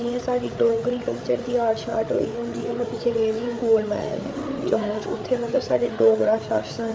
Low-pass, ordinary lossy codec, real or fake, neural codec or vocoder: none; none; fake; codec, 16 kHz, 8 kbps, FreqCodec, larger model